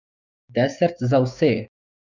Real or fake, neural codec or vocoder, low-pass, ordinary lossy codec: fake; codec, 16 kHz, 6 kbps, DAC; 7.2 kHz; none